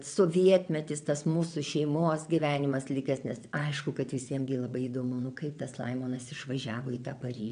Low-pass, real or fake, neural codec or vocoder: 9.9 kHz; fake; vocoder, 22.05 kHz, 80 mel bands, WaveNeXt